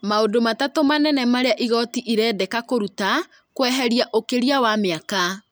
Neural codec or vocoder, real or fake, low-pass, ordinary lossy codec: none; real; none; none